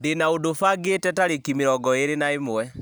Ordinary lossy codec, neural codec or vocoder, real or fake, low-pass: none; none; real; none